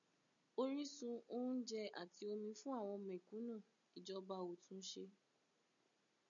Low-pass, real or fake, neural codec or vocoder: 7.2 kHz; real; none